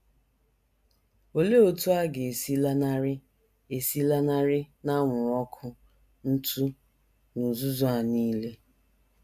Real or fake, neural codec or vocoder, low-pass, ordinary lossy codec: real; none; 14.4 kHz; AAC, 96 kbps